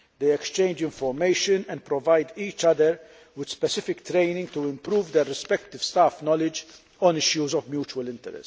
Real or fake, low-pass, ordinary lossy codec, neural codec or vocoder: real; none; none; none